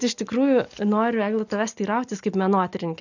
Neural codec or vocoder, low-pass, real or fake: none; 7.2 kHz; real